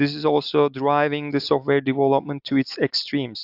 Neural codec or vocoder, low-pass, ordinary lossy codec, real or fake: none; 5.4 kHz; AAC, 48 kbps; real